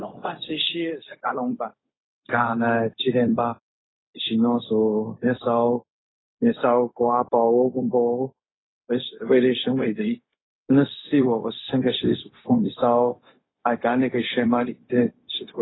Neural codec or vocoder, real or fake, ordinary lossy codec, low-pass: codec, 16 kHz, 0.4 kbps, LongCat-Audio-Codec; fake; AAC, 16 kbps; 7.2 kHz